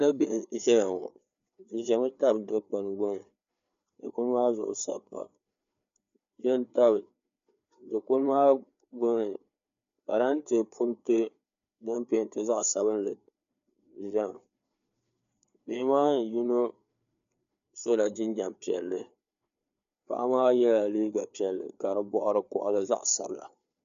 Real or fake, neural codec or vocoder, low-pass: fake; codec, 16 kHz, 4 kbps, FreqCodec, larger model; 7.2 kHz